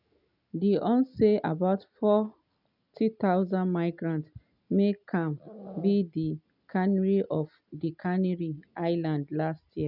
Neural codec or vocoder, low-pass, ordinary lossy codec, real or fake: none; 5.4 kHz; none; real